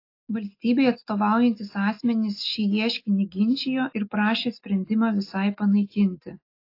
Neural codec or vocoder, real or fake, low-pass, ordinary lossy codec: none; real; 5.4 kHz; AAC, 32 kbps